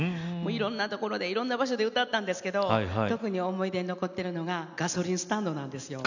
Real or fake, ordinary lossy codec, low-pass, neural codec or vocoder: real; none; 7.2 kHz; none